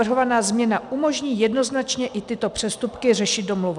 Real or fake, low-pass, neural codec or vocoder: real; 10.8 kHz; none